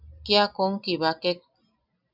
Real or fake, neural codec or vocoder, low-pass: real; none; 5.4 kHz